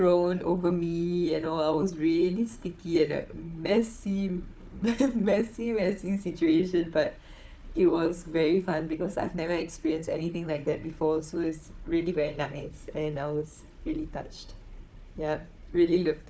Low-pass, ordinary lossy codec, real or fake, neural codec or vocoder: none; none; fake; codec, 16 kHz, 4 kbps, FunCodec, trained on Chinese and English, 50 frames a second